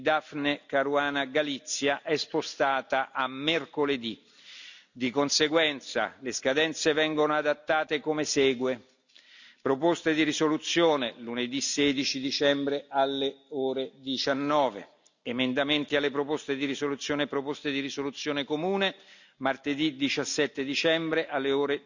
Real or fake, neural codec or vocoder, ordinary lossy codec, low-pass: real; none; none; 7.2 kHz